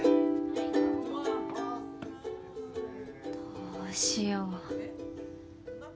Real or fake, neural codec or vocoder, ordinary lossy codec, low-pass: real; none; none; none